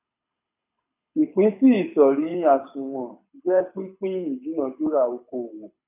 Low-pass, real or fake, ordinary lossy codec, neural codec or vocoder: 3.6 kHz; fake; none; codec, 24 kHz, 6 kbps, HILCodec